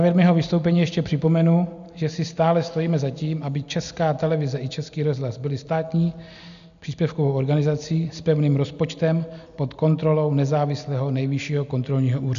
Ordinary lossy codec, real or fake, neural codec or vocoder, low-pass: AAC, 96 kbps; real; none; 7.2 kHz